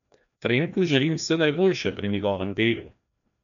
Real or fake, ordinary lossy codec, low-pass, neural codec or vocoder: fake; none; 7.2 kHz; codec, 16 kHz, 1 kbps, FreqCodec, larger model